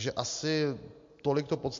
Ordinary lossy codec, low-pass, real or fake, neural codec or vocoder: MP3, 48 kbps; 7.2 kHz; real; none